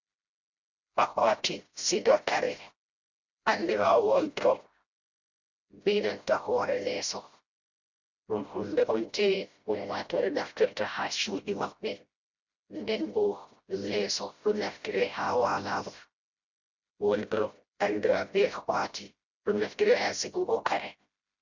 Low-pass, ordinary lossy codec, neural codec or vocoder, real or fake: 7.2 kHz; Opus, 64 kbps; codec, 16 kHz, 0.5 kbps, FreqCodec, smaller model; fake